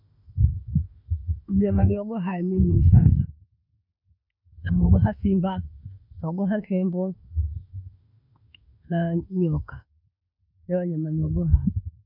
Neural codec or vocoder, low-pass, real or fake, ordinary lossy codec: autoencoder, 48 kHz, 32 numbers a frame, DAC-VAE, trained on Japanese speech; 5.4 kHz; fake; none